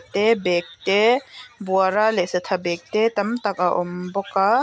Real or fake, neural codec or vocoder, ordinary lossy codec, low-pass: real; none; none; none